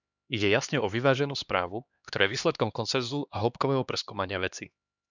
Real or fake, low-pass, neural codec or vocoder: fake; 7.2 kHz; codec, 16 kHz, 2 kbps, X-Codec, HuBERT features, trained on LibriSpeech